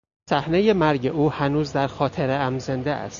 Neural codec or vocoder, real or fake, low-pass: none; real; 7.2 kHz